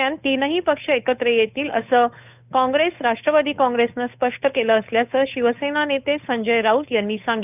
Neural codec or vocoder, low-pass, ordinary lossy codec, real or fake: codec, 16 kHz, 8 kbps, FunCodec, trained on Chinese and English, 25 frames a second; 3.6 kHz; none; fake